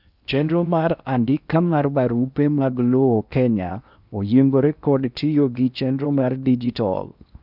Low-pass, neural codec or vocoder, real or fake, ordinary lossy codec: 5.4 kHz; codec, 16 kHz in and 24 kHz out, 0.8 kbps, FocalCodec, streaming, 65536 codes; fake; none